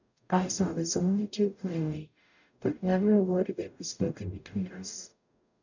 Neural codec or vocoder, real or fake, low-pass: codec, 44.1 kHz, 0.9 kbps, DAC; fake; 7.2 kHz